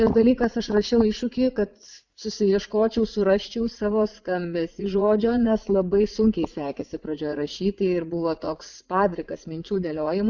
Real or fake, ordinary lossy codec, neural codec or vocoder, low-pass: fake; Opus, 64 kbps; vocoder, 44.1 kHz, 80 mel bands, Vocos; 7.2 kHz